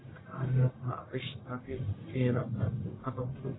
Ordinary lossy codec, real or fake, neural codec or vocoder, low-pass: AAC, 16 kbps; fake; codec, 44.1 kHz, 1.7 kbps, Pupu-Codec; 7.2 kHz